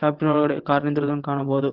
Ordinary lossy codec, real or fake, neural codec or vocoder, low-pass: Opus, 16 kbps; fake; vocoder, 22.05 kHz, 80 mel bands, WaveNeXt; 5.4 kHz